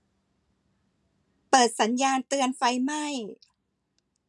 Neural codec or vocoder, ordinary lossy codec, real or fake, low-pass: none; none; real; none